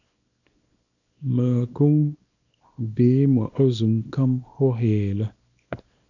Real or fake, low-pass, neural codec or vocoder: fake; 7.2 kHz; codec, 24 kHz, 0.9 kbps, WavTokenizer, small release